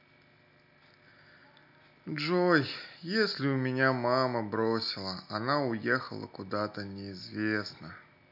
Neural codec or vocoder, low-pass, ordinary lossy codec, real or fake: none; 5.4 kHz; none; real